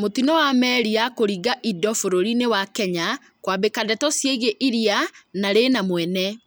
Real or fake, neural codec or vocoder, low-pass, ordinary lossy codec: real; none; none; none